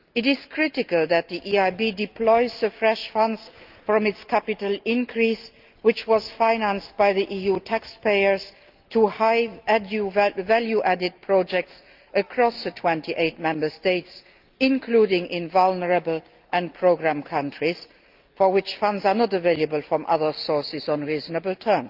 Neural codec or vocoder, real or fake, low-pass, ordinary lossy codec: none; real; 5.4 kHz; Opus, 24 kbps